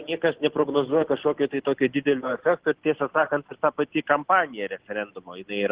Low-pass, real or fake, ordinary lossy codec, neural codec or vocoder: 3.6 kHz; real; Opus, 16 kbps; none